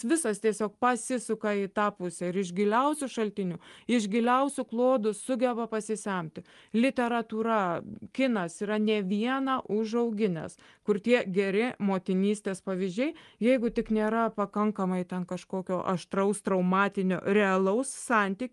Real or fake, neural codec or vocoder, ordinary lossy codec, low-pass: real; none; Opus, 32 kbps; 10.8 kHz